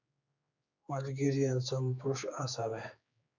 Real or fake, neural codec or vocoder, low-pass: fake; codec, 16 kHz, 4 kbps, X-Codec, HuBERT features, trained on general audio; 7.2 kHz